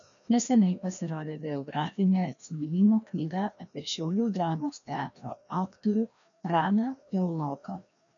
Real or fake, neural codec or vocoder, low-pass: fake; codec, 16 kHz, 1 kbps, FreqCodec, larger model; 7.2 kHz